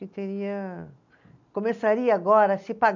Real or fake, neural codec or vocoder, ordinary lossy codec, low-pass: real; none; none; 7.2 kHz